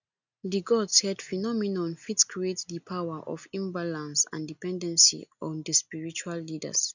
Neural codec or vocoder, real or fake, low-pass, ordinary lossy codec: none; real; 7.2 kHz; none